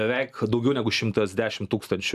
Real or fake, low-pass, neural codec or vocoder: real; 14.4 kHz; none